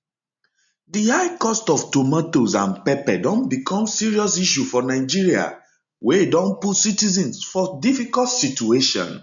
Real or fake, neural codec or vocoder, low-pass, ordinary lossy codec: real; none; 7.2 kHz; MP3, 64 kbps